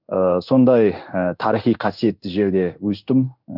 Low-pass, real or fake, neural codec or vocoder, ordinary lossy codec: 5.4 kHz; fake; codec, 16 kHz in and 24 kHz out, 1 kbps, XY-Tokenizer; none